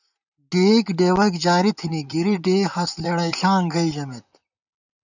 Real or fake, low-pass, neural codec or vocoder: fake; 7.2 kHz; vocoder, 44.1 kHz, 128 mel bands, Pupu-Vocoder